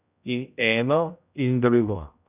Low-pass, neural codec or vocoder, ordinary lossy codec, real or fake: 3.6 kHz; codec, 16 kHz, 0.5 kbps, X-Codec, HuBERT features, trained on general audio; none; fake